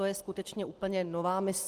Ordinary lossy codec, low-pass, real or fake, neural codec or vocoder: Opus, 24 kbps; 14.4 kHz; real; none